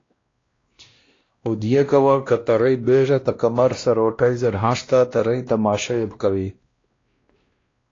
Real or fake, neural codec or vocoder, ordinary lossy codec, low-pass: fake; codec, 16 kHz, 1 kbps, X-Codec, WavLM features, trained on Multilingual LibriSpeech; AAC, 32 kbps; 7.2 kHz